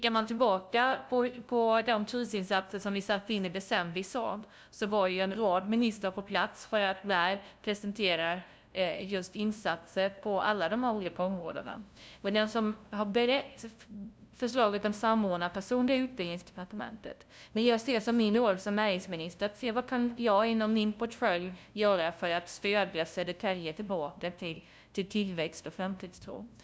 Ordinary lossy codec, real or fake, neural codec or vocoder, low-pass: none; fake; codec, 16 kHz, 0.5 kbps, FunCodec, trained on LibriTTS, 25 frames a second; none